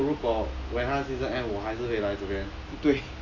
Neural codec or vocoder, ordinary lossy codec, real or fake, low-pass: none; none; real; 7.2 kHz